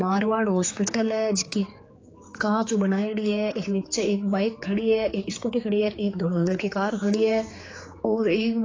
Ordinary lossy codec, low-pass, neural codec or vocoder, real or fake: AAC, 32 kbps; 7.2 kHz; codec, 16 kHz, 4 kbps, X-Codec, HuBERT features, trained on general audio; fake